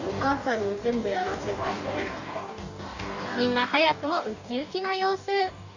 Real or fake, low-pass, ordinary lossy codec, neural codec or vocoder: fake; 7.2 kHz; none; codec, 44.1 kHz, 2.6 kbps, DAC